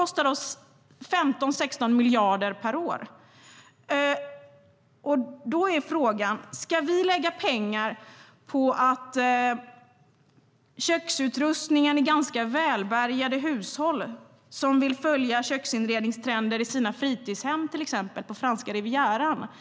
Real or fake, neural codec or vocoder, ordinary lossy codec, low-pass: real; none; none; none